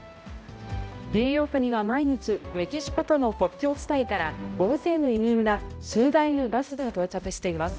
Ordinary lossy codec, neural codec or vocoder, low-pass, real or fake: none; codec, 16 kHz, 0.5 kbps, X-Codec, HuBERT features, trained on balanced general audio; none; fake